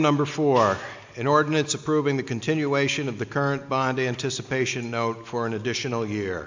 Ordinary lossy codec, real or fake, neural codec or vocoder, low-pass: MP3, 64 kbps; real; none; 7.2 kHz